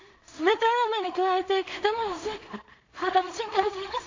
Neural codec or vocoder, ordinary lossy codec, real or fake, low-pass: codec, 16 kHz in and 24 kHz out, 0.4 kbps, LongCat-Audio-Codec, two codebook decoder; MP3, 48 kbps; fake; 7.2 kHz